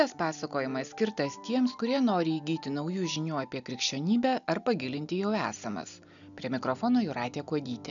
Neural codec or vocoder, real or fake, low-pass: none; real; 7.2 kHz